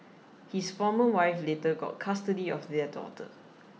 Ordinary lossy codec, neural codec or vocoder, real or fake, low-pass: none; none; real; none